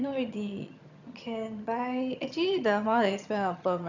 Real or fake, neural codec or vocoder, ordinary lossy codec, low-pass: fake; vocoder, 22.05 kHz, 80 mel bands, HiFi-GAN; none; 7.2 kHz